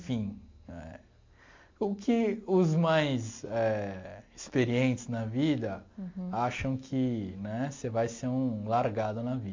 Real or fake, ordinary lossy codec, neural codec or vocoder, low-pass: real; MP3, 48 kbps; none; 7.2 kHz